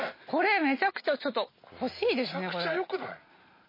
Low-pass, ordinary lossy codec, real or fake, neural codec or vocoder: 5.4 kHz; MP3, 24 kbps; real; none